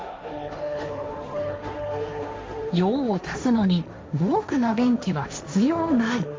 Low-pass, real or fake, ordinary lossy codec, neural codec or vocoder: none; fake; none; codec, 16 kHz, 1.1 kbps, Voila-Tokenizer